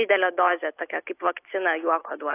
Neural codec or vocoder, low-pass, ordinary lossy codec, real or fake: none; 3.6 kHz; AAC, 24 kbps; real